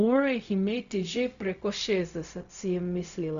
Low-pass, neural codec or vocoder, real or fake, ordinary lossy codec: 7.2 kHz; codec, 16 kHz, 0.4 kbps, LongCat-Audio-Codec; fake; none